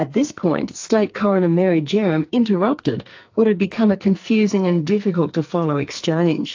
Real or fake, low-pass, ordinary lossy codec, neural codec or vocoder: fake; 7.2 kHz; AAC, 48 kbps; codec, 44.1 kHz, 2.6 kbps, SNAC